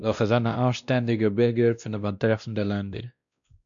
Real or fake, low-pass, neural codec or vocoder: fake; 7.2 kHz; codec, 16 kHz, 1 kbps, X-Codec, WavLM features, trained on Multilingual LibriSpeech